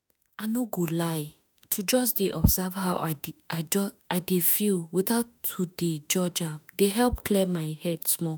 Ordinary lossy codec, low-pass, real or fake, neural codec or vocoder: none; none; fake; autoencoder, 48 kHz, 32 numbers a frame, DAC-VAE, trained on Japanese speech